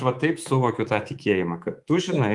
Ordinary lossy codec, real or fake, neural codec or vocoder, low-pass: Opus, 24 kbps; fake; codec, 24 kHz, 3.1 kbps, DualCodec; 10.8 kHz